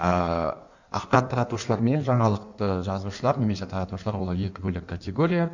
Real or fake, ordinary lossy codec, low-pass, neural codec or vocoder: fake; none; 7.2 kHz; codec, 16 kHz in and 24 kHz out, 1.1 kbps, FireRedTTS-2 codec